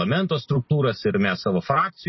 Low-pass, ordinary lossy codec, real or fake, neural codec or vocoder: 7.2 kHz; MP3, 24 kbps; real; none